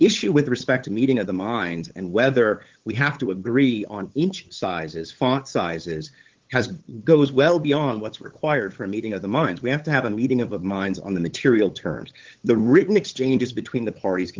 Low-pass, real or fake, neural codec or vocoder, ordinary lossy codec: 7.2 kHz; fake; codec, 16 kHz, 8 kbps, FunCodec, trained on LibriTTS, 25 frames a second; Opus, 16 kbps